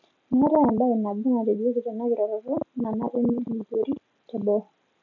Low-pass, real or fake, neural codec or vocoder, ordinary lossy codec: 7.2 kHz; real; none; none